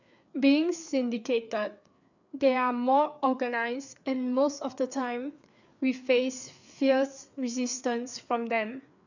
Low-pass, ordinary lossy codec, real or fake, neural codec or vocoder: 7.2 kHz; none; fake; codec, 16 kHz, 4 kbps, FreqCodec, larger model